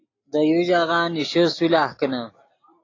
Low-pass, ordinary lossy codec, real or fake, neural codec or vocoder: 7.2 kHz; AAC, 32 kbps; real; none